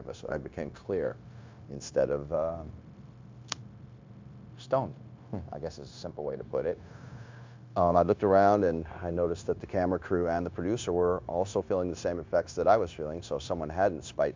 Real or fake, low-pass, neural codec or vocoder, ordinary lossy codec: fake; 7.2 kHz; codec, 16 kHz, 0.9 kbps, LongCat-Audio-Codec; MP3, 64 kbps